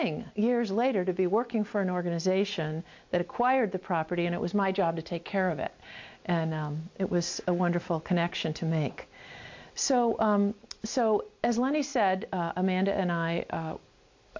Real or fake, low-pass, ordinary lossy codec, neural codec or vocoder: real; 7.2 kHz; MP3, 48 kbps; none